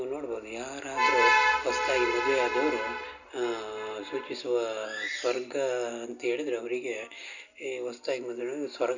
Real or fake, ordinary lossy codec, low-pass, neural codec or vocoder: real; none; 7.2 kHz; none